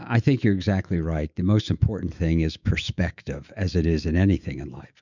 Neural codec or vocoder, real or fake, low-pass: autoencoder, 48 kHz, 128 numbers a frame, DAC-VAE, trained on Japanese speech; fake; 7.2 kHz